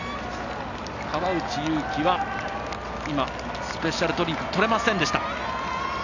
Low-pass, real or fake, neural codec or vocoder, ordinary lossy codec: 7.2 kHz; real; none; none